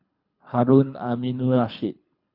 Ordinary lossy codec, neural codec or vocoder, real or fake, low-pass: none; codec, 24 kHz, 3 kbps, HILCodec; fake; 5.4 kHz